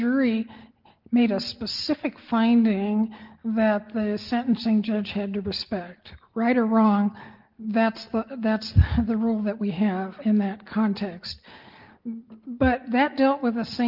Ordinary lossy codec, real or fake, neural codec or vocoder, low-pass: Opus, 32 kbps; real; none; 5.4 kHz